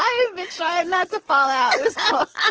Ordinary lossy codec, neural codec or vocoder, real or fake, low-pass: Opus, 16 kbps; codec, 44.1 kHz, 7.8 kbps, DAC; fake; 7.2 kHz